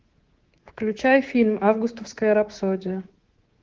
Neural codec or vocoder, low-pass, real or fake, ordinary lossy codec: codec, 24 kHz, 3.1 kbps, DualCodec; 7.2 kHz; fake; Opus, 16 kbps